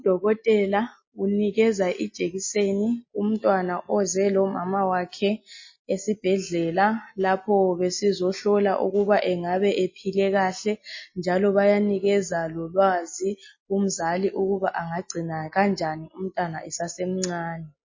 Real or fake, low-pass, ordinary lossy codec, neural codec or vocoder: real; 7.2 kHz; MP3, 32 kbps; none